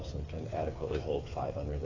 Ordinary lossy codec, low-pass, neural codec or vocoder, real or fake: AAC, 32 kbps; 7.2 kHz; codec, 16 kHz, 8 kbps, FreqCodec, smaller model; fake